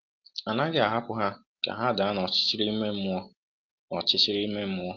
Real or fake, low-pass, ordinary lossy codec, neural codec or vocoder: real; 7.2 kHz; Opus, 32 kbps; none